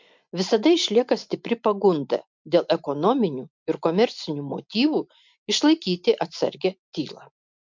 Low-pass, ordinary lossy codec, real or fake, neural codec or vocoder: 7.2 kHz; MP3, 64 kbps; real; none